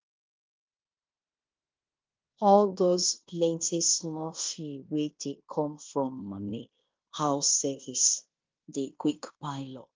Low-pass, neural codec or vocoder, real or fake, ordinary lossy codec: 7.2 kHz; codec, 16 kHz in and 24 kHz out, 0.9 kbps, LongCat-Audio-Codec, fine tuned four codebook decoder; fake; Opus, 24 kbps